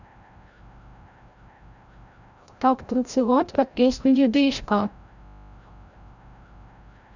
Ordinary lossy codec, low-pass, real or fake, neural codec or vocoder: none; 7.2 kHz; fake; codec, 16 kHz, 0.5 kbps, FreqCodec, larger model